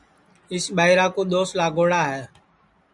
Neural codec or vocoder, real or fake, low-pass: none; real; 10.8 kHz